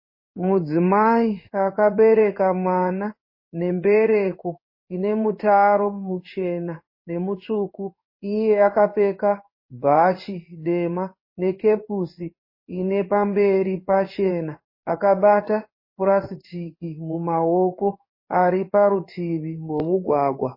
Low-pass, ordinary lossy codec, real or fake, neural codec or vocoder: 5.4 kHz; MP3, 24 kbps; fake; codec, 16 kHz in and 24 kHz out, 1 kbps, XY-Tokenizer